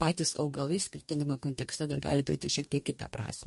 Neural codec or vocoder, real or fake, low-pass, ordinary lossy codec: codec, 44.1 kHz, 2.6 kbps, DAC; fake; 14.4 kHz; MP3, 48 kbps